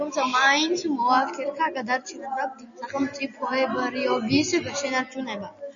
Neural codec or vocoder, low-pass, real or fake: none; 7.2 kHz; real